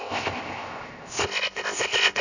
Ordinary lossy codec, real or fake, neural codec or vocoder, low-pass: none; fake; codec, 16 kHz, 0.7 kbps, FocalCodec; 7.2 kHz